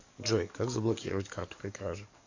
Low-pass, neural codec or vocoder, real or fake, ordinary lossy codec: 7.2 kHz; codec, 44.1 kHz, 7.8 kbps, DAC; fake; AAC, 48 kbps